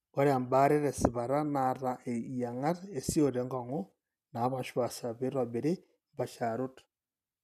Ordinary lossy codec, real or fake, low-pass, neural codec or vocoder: none; real; 14.4 kHz; none